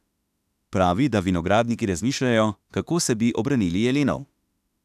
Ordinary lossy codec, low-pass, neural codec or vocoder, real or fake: none; 14.4 kHz; autoencoder, 48 kHz, 32 numbers a frame, DAC-VAE, trained on Japanese speech; fake